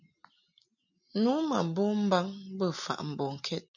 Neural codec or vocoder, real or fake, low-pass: none; real; 7.2 kHz